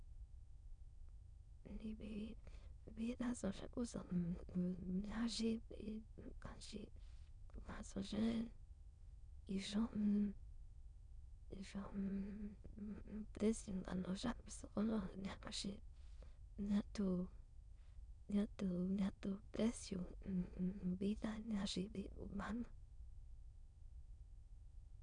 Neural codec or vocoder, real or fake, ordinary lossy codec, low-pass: autoencoder, 22.05 kHz, a latent of 192 numbers a frame, VITS, trained on many speakers; fake; none; 9.9 kHz